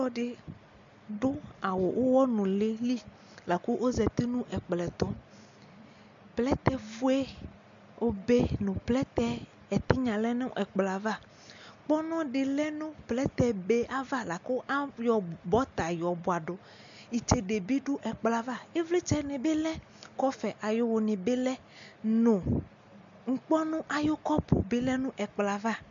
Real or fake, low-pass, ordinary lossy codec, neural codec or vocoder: real; 7.2 kHz; MP3, 64 kbps; none